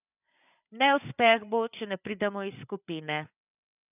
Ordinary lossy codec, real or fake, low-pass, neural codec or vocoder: none; fake; 3.6 kHz; codec, 16 kHz, 8 kbps, FreqCodec, larger model